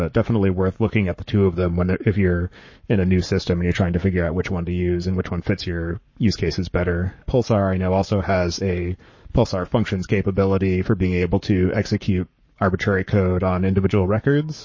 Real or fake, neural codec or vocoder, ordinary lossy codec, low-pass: fake; codec, 44.1 kHz, 7.8 kbps, Pupu-Codec; MP3, 32 kbps; 7.2 kHz